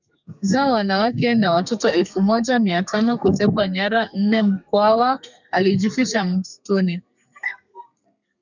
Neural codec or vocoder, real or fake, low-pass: codec, 32 kHz, 1.9 kbps, SNAC; fake; 7.2 kHz